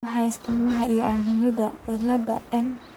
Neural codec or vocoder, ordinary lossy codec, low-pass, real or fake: codec, 44.1 kHz, 1.7 kbps, Pupu-Codec; none; none; fake